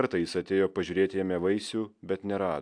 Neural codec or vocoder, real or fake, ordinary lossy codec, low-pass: none; real; AAC, 64 kbps; 9.9 kHz